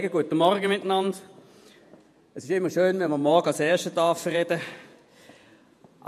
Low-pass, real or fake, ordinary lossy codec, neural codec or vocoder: 14.4 kHz; fake; MP3, 64 kbps; vocoder, 48 kHz, 128 mel bands, Vocos